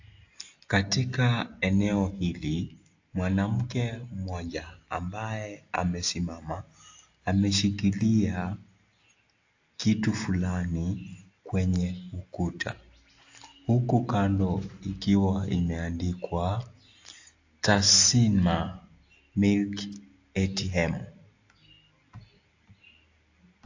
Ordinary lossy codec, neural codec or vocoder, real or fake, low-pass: AAC, 48 kbps; none; real; 7.2 kHz